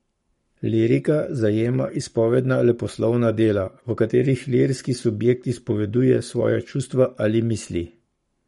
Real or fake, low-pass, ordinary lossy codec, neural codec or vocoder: fake; 19.8 kHz; MP3, 48 kbps; codec, 44.1 kHz, 7.8 kbps, Pupu-Codec